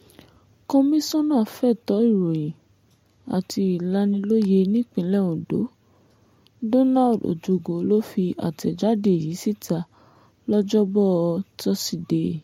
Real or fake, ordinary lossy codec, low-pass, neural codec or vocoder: real; MP3, 64 kbps; 19.8 kHz; none